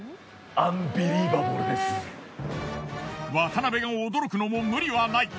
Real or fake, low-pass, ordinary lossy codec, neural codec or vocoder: real; none; none; none